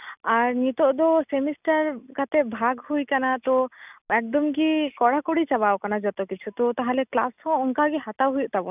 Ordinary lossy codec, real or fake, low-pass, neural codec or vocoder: none; real; 3.6 kHz; none